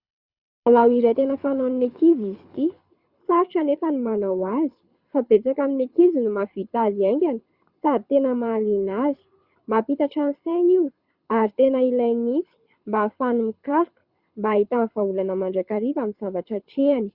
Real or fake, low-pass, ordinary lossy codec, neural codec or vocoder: fake; 5.4 kHz; Opus, 64 kbps; codec, 24 kHz, 6 kbps, HILCodec